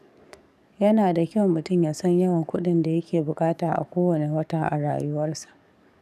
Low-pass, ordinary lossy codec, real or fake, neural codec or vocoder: 14.4 kHz; none; fake; codec, 44.1 kHz, 7.8 kbps, DAC